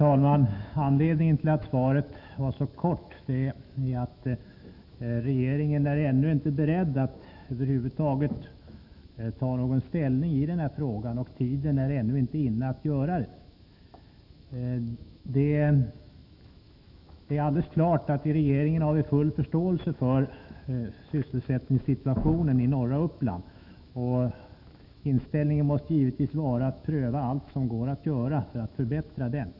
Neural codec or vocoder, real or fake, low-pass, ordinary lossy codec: autoencoder, 48 kHz, 128 numbers a frame, DAC-VAE, trained on Japanese speech; fake; 5.4 kHz; none